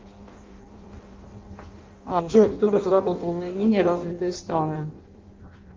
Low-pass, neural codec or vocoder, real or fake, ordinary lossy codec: 7.2 kHz; codec, 16 kHz in and 24 kHz out, 0.6 kbps, FireRedTTS-2 codec; fake; Opus, 32 kbps